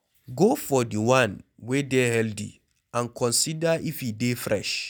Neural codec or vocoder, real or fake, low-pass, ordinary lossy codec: none; real; none; none